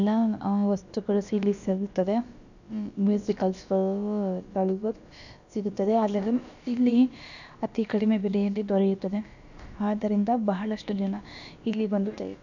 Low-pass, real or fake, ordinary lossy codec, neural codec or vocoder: 7.2 kHz; fake; none; codec, 16 kHz, about 1 kbps, DyCAST, with the encoder's durations